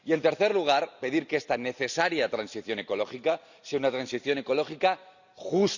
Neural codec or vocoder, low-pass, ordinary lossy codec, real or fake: none; 7.2 kHz; none; real